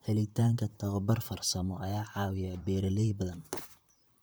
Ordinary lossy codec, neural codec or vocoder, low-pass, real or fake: none; vocoder, 44.1 kHz, 128 mel bands, Pupu-Vocoder; none; fake